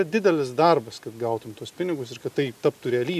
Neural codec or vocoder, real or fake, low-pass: none; real; 14.4 kHz